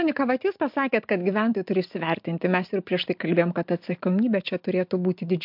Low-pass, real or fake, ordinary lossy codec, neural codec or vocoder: 5.4 kHz; real; MP3, 48 kbps; none